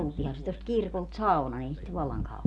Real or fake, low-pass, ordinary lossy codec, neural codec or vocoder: real; none; none; none